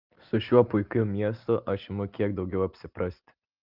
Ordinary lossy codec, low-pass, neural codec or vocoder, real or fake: Opus, 32 kbps; 5.4 kHz; none; real